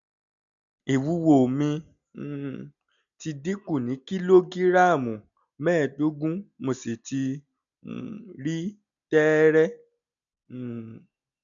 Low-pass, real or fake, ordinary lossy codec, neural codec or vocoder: 7.2 kHz; real; none; none